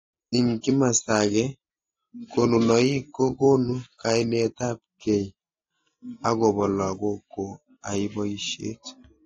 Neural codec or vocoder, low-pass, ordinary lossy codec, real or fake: none; 7.2 kHz; AAC, 32 kbps; real